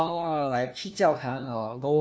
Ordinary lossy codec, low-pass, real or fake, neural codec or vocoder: none; none; fake; codec, 16 kHz, 1 kbps, FunCodec, trained on LibriTTS, 50 frames a second